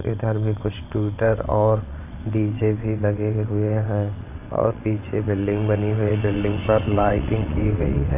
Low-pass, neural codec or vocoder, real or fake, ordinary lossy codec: 3.6 kHz; vocoder, 22.05 kHz, 80 mel bands, WaveNeXt; fake; none